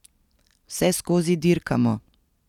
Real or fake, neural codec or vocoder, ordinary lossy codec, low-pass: real; none; none; 19.8 kHz